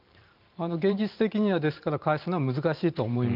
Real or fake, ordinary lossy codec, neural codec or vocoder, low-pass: fake; Opus, 24 kbps; vocoder, 44.1 kHz, 128 mel bands every 512 samples, BigVGAN v2; 5.4 kHz